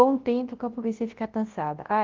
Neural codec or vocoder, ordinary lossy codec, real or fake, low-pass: codec, 24 kHz, 0.9 kbps, WavTokenizer, large speech release; Opus, 16 kbps; fake; 7.2 kHz